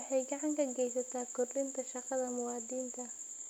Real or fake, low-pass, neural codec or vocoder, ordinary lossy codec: real; 19.8 kHz; none; none